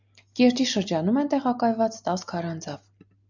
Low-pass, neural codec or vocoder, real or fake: 7.2 kHz; none; real